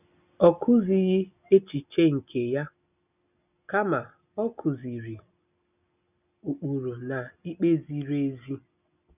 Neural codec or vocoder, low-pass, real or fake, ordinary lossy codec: none; 3.6 kHz; real; none